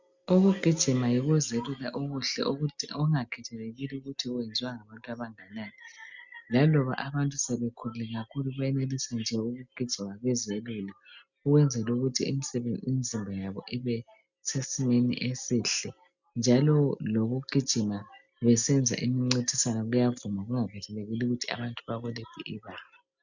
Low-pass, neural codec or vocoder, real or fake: 7.2 kHz; none; real